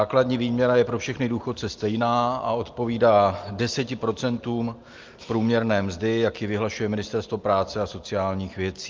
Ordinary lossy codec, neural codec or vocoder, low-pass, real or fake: Opus, 32 kbps; none; 7.2 kHz; real